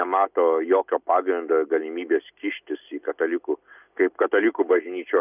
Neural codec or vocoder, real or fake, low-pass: none; real; 3.6 kHz